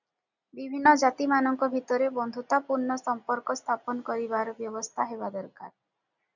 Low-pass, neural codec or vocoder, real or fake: 7.2 kHz; none; real